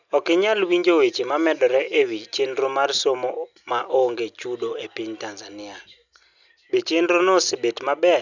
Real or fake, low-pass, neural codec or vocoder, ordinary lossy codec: real; 7.2 kHz; none; none